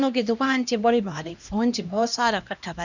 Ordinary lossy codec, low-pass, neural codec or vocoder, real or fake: none; 7.2 kHz; codec, 16 kHz, 1 kbps, X-Codec, HuBERT features, trained on LibriSpeech; fake